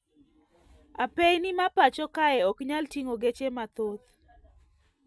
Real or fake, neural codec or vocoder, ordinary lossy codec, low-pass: real; none; none; none